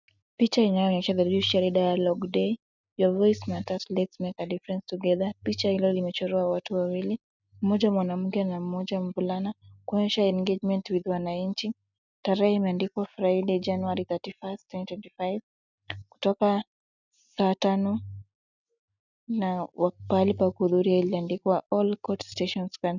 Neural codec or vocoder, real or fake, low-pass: none; real; 7.2 kHz